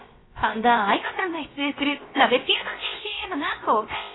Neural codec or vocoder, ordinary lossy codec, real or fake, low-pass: codec, 16 kHz, about 1 kbps, DyCAST, with the encoder's durations; AAC, 16 kbps; fake; 7.2 kHz